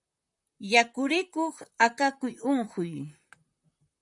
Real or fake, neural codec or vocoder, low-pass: fake; vocoder, 44.1 kHz, 128 mel bands, Pupu-Vocoder; 10.8 kHz